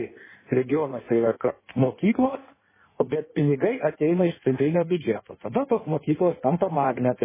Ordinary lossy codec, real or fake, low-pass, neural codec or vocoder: MP3, 16 kbps; fake; 3.6 kHz; codec, 16 kHz in and 24 kHz out, 1.1 kbps, FireRedTTS-2 codec